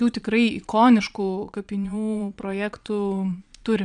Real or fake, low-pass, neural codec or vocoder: fake; 9.9 kHz; vocoder, 22.05 kHz, 80 mel bands, Vocos